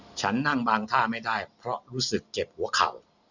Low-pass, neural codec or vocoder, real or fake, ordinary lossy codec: 7.2 kHz; none; real; none